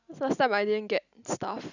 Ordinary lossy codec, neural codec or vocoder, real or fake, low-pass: none; none; real; 7.2 kHz